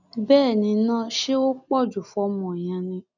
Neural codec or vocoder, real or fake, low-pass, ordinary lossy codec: none; real; 7.2 kHz; none